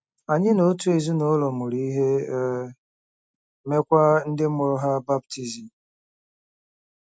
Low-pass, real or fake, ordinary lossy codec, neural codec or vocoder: none; real; none; none